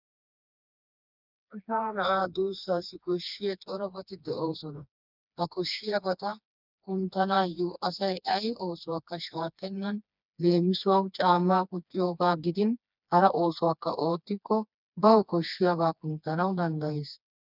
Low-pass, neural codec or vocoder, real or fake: 5.4 kHz; codec, 16 kHz, 2 kbps, FreqCodec, smaller model; fake